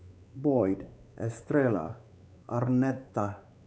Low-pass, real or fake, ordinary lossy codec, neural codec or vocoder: none; fake; none; codec, 16 kHz, 4 kbps, X-Codec, WavLM features, trained on Multilingual LibriSpeech